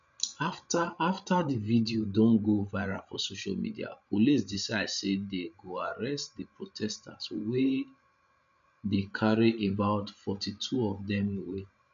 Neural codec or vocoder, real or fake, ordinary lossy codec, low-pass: codec, 16 kHz, 16 kbps, FreqCodec, larger model; fake; none; 7.2 kHz